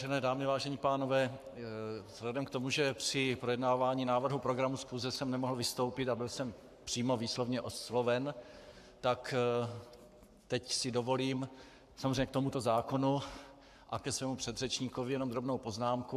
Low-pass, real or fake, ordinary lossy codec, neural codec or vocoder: 14.4 kHz; fake; AAC, 96 kbps; codec, 44.1 kHz, 7.8 kbps, Pupu-Codec